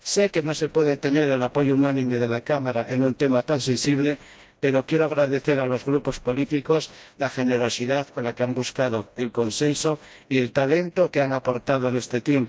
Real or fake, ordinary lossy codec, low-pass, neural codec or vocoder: fake; none; none; codec, 16 kHz, 1 kbps, FreqCodec, smaller model